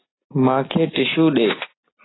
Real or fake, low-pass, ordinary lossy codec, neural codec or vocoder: real; 7.2 kHz; AAC, 16 kbps; none